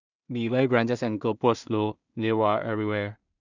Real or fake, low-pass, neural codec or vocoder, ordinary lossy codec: fake; 7.2 kHz; codec, 16 kHz in and 24 kHz out, 0.4 kbps, LongCat-Audio-Codec, two codebook decoder; none